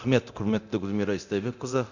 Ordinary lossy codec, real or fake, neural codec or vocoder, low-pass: none; fake; codec, 24 kHz, 0.9 kbps, DualCodec; 7.2 kHz